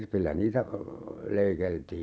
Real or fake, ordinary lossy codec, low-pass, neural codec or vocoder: real; none; none; none